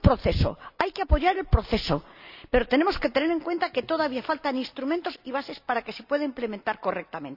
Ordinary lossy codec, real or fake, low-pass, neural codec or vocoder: none; real; 5.4 kHz; none